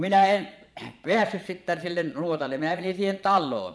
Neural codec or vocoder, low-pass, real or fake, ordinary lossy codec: vocoder, 22.05 kHz, 80 mel bands, Vocos; none; fake; none